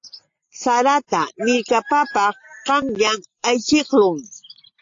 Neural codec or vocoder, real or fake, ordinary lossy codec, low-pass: none; real; AAC, 48 kbps; 7.2 kHz